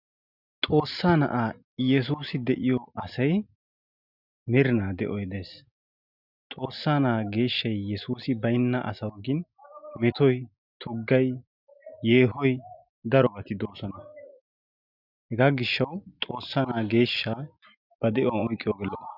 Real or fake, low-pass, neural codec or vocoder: real; 5.4 kHz; none